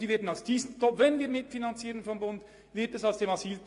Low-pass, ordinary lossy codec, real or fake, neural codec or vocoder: 10.8 kHz; AAC, 48 kbps; fake; vocoder, 24 kHz, 100 mel bands, Vocos